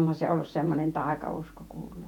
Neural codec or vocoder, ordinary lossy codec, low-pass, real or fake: vocoder, 48 kHz, 128 mel bands, Vocos; none; 19.8 kHz; fake